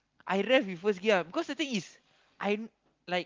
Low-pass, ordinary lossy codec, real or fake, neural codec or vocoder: 7.2 kHz; Opus, 32 kbps; real; none